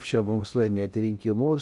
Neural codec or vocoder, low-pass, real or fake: codec, 16 kHz in and 24 kHz out, 0.6 kbps, FocalCodec, streaming, 2048 codes; 10.8 kHz; fake